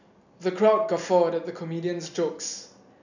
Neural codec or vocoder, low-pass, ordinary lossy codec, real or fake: none; 7.2 kHz; none; real